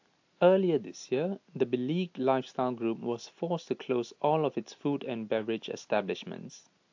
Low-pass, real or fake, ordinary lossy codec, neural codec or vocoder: 7.2 kHz; real; AAC, 48 kbps; none